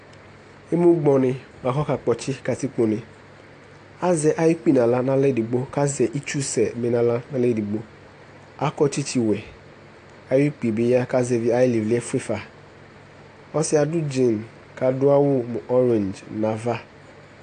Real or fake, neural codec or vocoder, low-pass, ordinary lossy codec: real; none; 9.9 kHz; AAC, 48 kbps